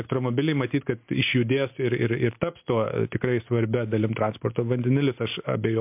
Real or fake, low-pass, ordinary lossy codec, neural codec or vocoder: real; 3.6 kHz; MP3, 32 kbps; none